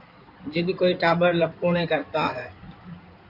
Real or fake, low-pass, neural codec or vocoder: fake; 5.4 kHz; codec, 16 kHz in and 24 kHz out, 2.2 kbps, FireRedTTS-2 codec